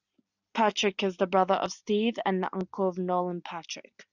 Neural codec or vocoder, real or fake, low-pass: none; real; 7.2 kHz